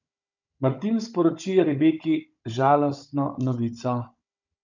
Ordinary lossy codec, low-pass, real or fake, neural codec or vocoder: none; 7.2 kHz; fake; codec, 16 kHz, 16 kbps, FunCodec, trained on Chinese and English, 50 frames a second